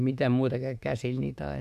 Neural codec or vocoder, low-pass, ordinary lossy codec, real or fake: autoencoder, 48 kHz, 32 numbers a frame, DAC-VAE, trained on Japanese speech; 14.4 kHz; Opus, 64 kbps; fake